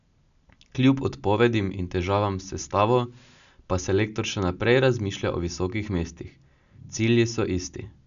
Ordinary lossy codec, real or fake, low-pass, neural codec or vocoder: none; real; 7.2 kHz; none